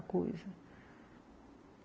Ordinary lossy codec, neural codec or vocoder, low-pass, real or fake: none; none; none; real